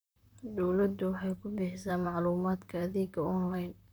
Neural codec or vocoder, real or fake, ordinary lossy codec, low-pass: vocoder, 44.1 kHz, 128 mel bands, Pupu-Vocoder; fake; none; none